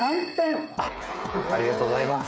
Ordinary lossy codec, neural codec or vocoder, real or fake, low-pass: none; codec, 16 kHz, 16 kbps, FreqCodec, smaller model; fake; none